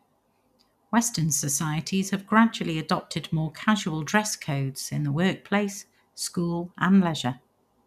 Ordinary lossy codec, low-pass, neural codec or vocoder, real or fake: none; 14.4 kHz; none; real